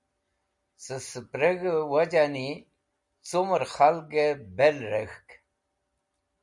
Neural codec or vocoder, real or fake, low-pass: none; real; 10.8 kHz